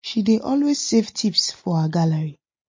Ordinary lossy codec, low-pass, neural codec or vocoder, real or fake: MP3, 32 kbps; 7.2 kHz; none; real